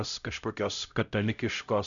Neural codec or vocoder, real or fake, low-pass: codec, 16 kHz, 0.5 kbps, X-Codec, HuBERT features, trained on LibriSpeech; fake; 7.2 kHz